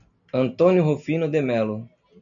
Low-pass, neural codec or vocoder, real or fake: 7.2 kHz; none; real